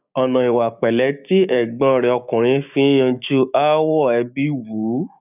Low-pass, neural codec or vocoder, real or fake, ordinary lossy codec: 3.6 kHz; codec, 16 kHz, 6 kbps, DAC; fake; none